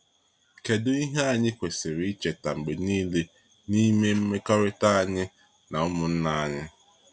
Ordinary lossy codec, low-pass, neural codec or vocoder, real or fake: none; none; none; real